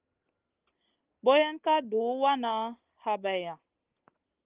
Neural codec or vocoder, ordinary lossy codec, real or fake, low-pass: none; Opus, 32 kbps; real; 3.6 kHz